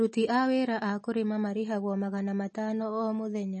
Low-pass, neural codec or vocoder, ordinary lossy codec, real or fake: 10.8 kHz; none; MP3, 32 kbps; real